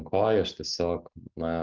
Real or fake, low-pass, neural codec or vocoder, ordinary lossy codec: real; 7.2 kHz; none; Opus, 24 kbps